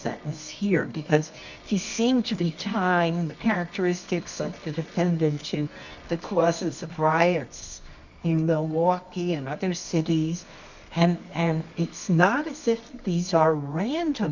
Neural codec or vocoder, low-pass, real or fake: codec, 24 kHz, 0.9 kbps, WavTokenizer, medium music audio release; 7.2 kHz; fake